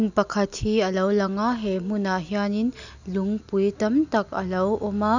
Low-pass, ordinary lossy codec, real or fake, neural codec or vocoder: 7.2 kHz; none; real; none